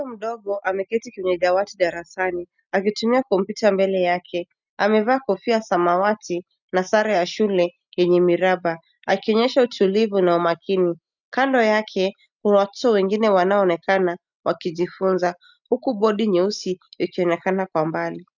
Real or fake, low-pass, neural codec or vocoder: real; 7.2 kHz; none